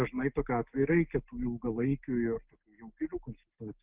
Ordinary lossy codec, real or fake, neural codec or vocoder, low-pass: Opus, 32 kbps; real; none; 3.6 kHz